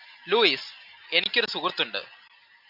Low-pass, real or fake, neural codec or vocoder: 5.4 kHz; real; none